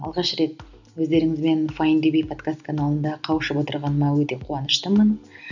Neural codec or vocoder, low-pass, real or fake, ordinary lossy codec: none; 7.2 kHz; real; none